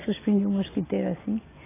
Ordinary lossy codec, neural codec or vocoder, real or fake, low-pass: AAC, 16 kbps; none; real; 3.6 kHz